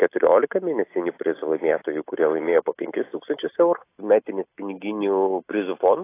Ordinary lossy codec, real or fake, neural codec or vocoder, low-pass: AAC, 24 kbps; real; none; 3.6 kHz